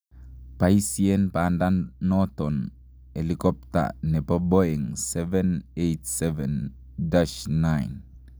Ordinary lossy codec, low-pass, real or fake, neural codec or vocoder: none; none; real; none